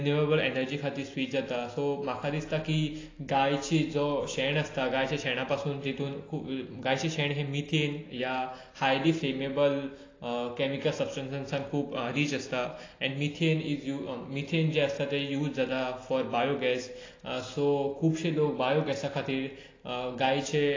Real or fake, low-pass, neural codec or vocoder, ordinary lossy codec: real; 7.2 kHz; none; AAC, 32 kbps